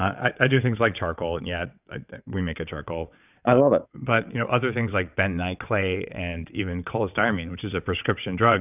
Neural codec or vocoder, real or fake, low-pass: vocoder, 22.05 kHz, 80 mel bands, WaveNeXt; fake; 3.6 kHz